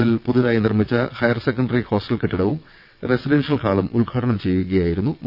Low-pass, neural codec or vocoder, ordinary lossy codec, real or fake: 5.4 kHz; vocoder, 22.05 kHz, 80 mel bands, WaveNeXt; none; fake